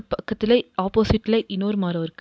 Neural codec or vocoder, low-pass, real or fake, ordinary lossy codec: none; none; real; none